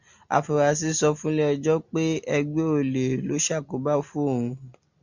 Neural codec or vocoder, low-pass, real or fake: none; 7.2 kHz; real